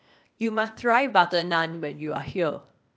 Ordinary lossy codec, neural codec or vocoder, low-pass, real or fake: none; codec, 16 kHz, 0.8 kbps, ZipCodec; none; fake